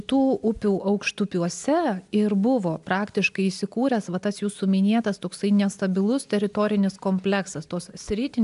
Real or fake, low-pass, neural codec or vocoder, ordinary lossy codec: real; 10.8 kHz; none; AAC, 96 kbps